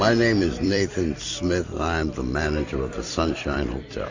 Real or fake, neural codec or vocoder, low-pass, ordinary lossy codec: fake; vocoder, 44.1 kHz, 128 mel bands every 256 samples, BigVGAN v2; 7.2 kHz; AAC, 48 kbps